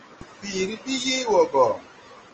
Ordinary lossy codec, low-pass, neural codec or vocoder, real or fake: Opus, 24 kbps; 7.2 kHz; none; real